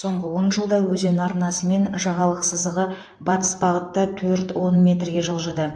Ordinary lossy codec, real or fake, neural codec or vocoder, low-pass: AAC, 64 kbps; fake; codec, 16 kHz in and 24 kHz out, 2.2 kbps, FireRedTTS-2 codec; 9.9 kHz